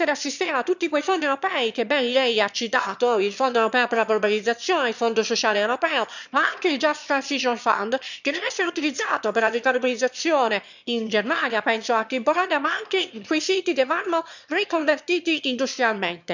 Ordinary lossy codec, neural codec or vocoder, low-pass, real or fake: none; autoencoder, 22.05 kHz, a latent of 192 numbers a frame, VITS, trained on one speaker; 7.2 kHz; fake